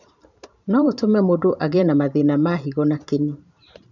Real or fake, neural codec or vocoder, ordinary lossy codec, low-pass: fake; vocoder, 44.1 kHz, 128 mel bands every 512 samples, BigVGAN v2; none; 7.2 kHz